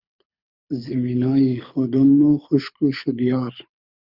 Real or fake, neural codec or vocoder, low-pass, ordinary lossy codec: fake; codec, 24 kHz, 6 kbps, HILCodec; 5.4 kHz; Opus, 64 kbps